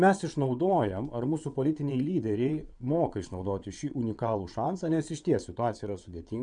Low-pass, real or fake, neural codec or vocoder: 9.9 kHz; fake; vocoder, 22.05 kHz, 80 mel bands, WaveNeXt